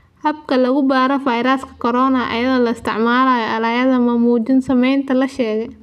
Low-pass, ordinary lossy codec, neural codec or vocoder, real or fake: 14.4 kHz; none; none; real